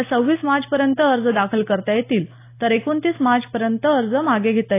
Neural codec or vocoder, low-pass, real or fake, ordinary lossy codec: none; 3.6 kHz; real; AAC, 24 kbps